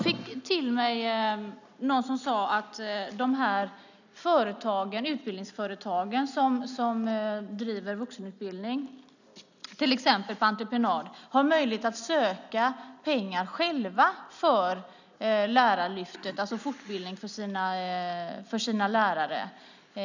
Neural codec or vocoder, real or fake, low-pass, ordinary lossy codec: none; real; 7.2 kHz; none